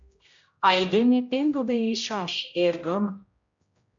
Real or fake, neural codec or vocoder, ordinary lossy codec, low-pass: fake; codec, 16 kHz, 0.5 kbps, X-Codec, HuBERT features, trained on general audio; MP3, 48 kbps; 7.2 kHz